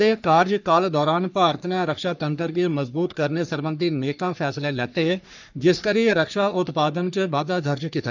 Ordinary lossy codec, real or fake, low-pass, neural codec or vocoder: none; fake; 7.2 kHz; codec, 44.1 kHz, 3.4 kbps, Pupu-Codec